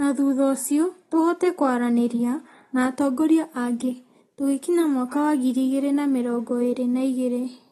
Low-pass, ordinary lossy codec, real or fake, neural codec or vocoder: 19.8 kHz; AAC, 32 kbps; real; none